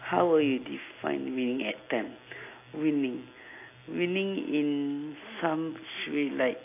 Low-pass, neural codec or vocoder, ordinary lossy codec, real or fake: 3.6 kHz; none; AAC, 24 kbps; real